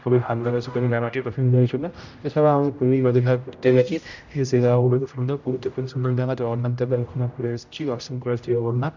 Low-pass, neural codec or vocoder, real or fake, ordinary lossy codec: 7.2 kHz; codec, 16 kHz, 0.5 kbps, X-Codec, HuBERT features, trained on general audio; fake; none